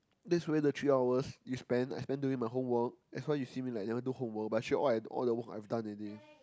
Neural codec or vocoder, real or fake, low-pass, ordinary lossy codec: none; real; none; none